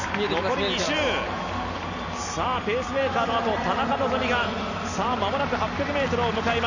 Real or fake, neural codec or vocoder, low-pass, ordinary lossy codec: real; none; 7.2 kHz; none